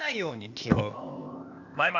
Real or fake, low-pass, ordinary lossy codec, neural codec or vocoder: fake; 7.2 kHz; none; codec, 16 kHz, 0.8 kbps, ZipCodec